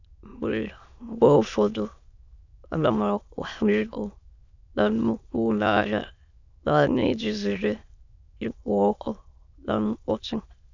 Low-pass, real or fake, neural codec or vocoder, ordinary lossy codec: 7.2 kHz; fake; autoencoder, 22.05 kHz, a latent of 192 numbers a frame, VITS, trained on many speakers; none